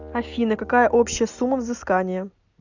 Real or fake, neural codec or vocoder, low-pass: real; none; 7.2 kHz